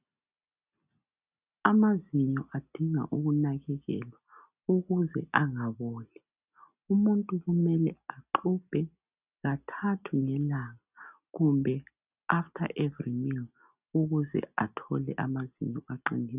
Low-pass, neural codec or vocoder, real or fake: 3.6 kHz; none; real